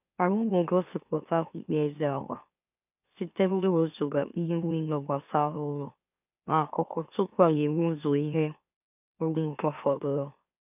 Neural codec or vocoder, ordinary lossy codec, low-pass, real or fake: autoencoder, 44.1 kHz, a latent of 192 numbers a frame, MeloTTS; none; 3.6 kHz; fake